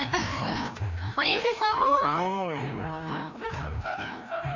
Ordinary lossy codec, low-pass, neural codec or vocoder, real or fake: none; 7.2 kHz; codec, 16 kHz, 1 kbps, FreqCodec, larger model; fake